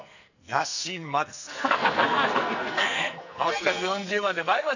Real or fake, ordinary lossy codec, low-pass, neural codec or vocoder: fake; none; 7.2 kHz; codec, 44.1 kHz, 2.6 kbps, SNAC